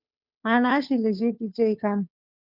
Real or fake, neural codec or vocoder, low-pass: fake; codec, 16 kHz, 2 kbps, FunCodec, trained on Chinese and English, 25 frames a second; 5.4 kHz